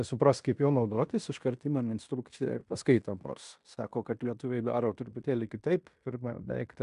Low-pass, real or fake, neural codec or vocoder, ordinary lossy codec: 10.8 kHz; fake; codec, 16 kHz in and 24 kHz out, 0.9 kbps, LongCat-Audio-Codec, fine tuned four codebook decoder; AAC, 96 kbps